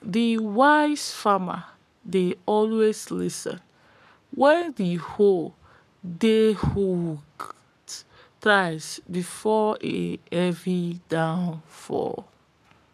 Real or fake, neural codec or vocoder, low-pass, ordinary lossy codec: fake; codec, 44.1 kHz, 7.8 kbps, Pupu-Codec; 14.4 kHz; none